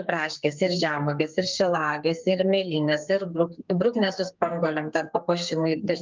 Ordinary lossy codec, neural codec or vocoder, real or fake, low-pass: Opus, 32 kbps; codec, 32 kHz, 1.9 kbps, SNAC; fake; 7.2 kHz